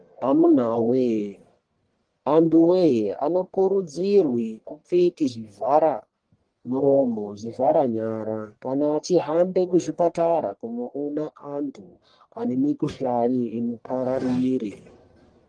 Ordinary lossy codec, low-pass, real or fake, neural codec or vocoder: Opus, 24 kbps; 9.9 kHz; fake; codec, 44.1 kHz, 1.7 kbps, Pupu-Codec